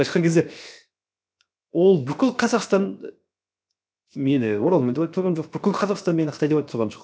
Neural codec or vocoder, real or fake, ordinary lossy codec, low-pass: codec, 16 kHz, 0.7 kbps, FocalCodec; fake; none; none